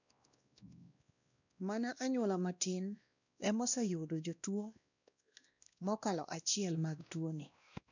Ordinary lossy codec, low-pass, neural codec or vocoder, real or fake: none; 7.2 kHz; codec, 16 kHz, 1 kbps, X-Codec, WavLM features, trained on Multilingual LibriSpeech; fake